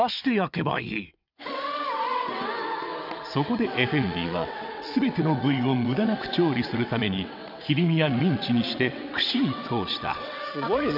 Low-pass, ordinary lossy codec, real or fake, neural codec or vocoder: 5.4 kHz; none; fake; vocoder, 22.05 kHz, 80 mel bands, WaveNeXt